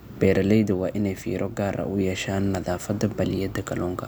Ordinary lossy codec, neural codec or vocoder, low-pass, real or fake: none; none; none; real